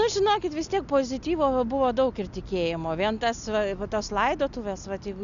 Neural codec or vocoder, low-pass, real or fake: none; 7.2 kHz; real